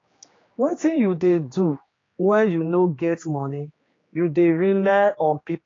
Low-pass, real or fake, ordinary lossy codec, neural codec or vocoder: 7.2 kHz; fake; AAC, 32 kbps; codec, 16 kHz, 2 kbps, X-Codec, HuBERT features, trained on general audio